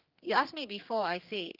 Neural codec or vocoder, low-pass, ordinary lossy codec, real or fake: codec, 16 kHz, 2 kbps, X-Codec, HuBERT features, trained on general audio; 5.4 kHz; Opus, 32 kbps; fake